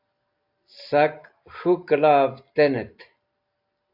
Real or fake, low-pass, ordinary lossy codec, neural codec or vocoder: real; 5.4 kHz; AAC, 48 kbps; none